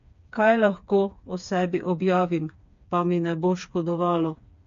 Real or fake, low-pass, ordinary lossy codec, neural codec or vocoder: fake; 7.2 kHz; MP3, 48 kbps; codec, 16 kHz, 4 kbps, FreqCodec, smaller model